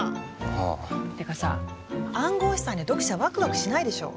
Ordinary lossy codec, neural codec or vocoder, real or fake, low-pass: none; none; real; none